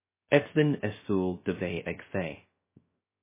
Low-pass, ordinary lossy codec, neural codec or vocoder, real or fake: 3.6 kHz; MP3, 16 kbps; codec, 16 kHz, 0.3 kbps, FocalCodec; fake